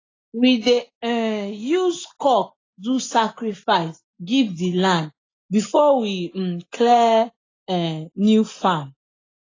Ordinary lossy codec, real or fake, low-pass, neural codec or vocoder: AAC, 32 kbps; real; 7.2 kHz; none